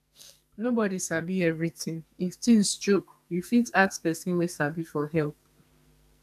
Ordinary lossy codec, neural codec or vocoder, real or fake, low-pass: none; codec, 44.1 kHz, 2.6 kbps, SNAC; fake; 14.4 kHz